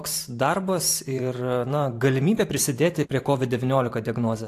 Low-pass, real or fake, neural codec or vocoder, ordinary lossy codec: 14.4 kHz; fake; vocoder, 44.1 kHz, 128 mel bands every 512 samples, BigVGAN v2; AAC, 64 kbps